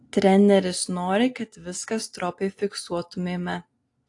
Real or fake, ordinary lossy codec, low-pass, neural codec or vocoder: real; AAC, 48 kbps; 10.8 kHz; none